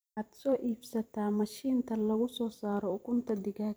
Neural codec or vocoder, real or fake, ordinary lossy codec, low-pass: vocoder, 44.1 kHz, 128 mel bands every 256 samples, BigVGAN v2; fake; none; none